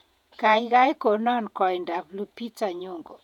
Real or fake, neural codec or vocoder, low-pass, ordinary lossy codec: fake; vocoder, 48 kHz, 128 mel bands, Vocos; 19.8 kHz; none